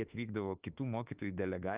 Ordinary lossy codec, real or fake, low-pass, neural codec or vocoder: Opus, 24 kbps; fake; 3.6 kHz; autoencoder, 48 kHz, 32 numbers a frame, DAC-VAE, trained on Japanese speech